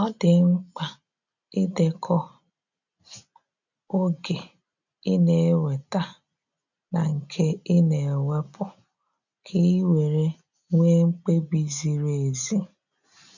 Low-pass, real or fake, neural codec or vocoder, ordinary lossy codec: 7.2 kHz; real; none; none